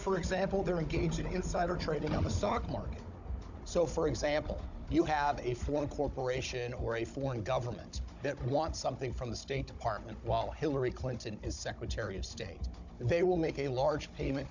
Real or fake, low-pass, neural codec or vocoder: fake; 7.2 kHz; codec, 16 kHz, 16 kbps, FunCodec, trained on Chinese and English, 50 frames a second